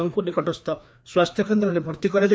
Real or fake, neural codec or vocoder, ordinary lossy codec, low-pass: fake; codec, 16 kHz, 2 kbps, FreqCodec, larger model; none; none